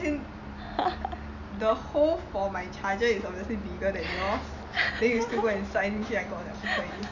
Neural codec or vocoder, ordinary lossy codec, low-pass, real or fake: none; none; 7.2 kHz; real